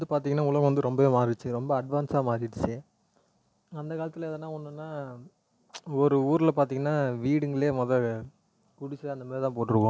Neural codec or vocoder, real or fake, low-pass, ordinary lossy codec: none; real; none; none